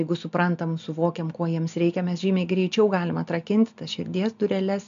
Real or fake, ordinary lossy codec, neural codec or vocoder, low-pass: real; MP3, 64 kbps; none; 7.2 kHz